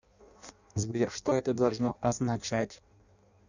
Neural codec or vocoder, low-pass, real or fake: codec, 16 kHz in and 24 kHz out, 0.6 kbps, FireRedTTS-2 codec; 7.2 kHz; fake